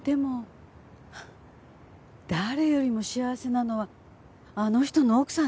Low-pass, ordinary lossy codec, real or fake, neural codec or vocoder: none; none; real; none